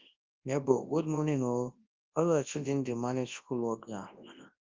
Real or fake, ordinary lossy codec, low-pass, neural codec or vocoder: fake; Opus, 24 kbps; 7.2 kHz; codec, 24 kHz, 0.9 kbps, WavTokenizer, large speech release